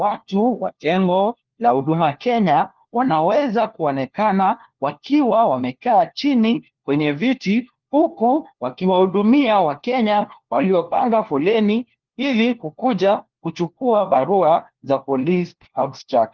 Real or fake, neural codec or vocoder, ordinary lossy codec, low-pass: fake; codec, 16 kHz, 1 kbps, FunCodec, trained on LibriTTS, 50 frames a second; Opus, 16 kbps; 7.2 kHz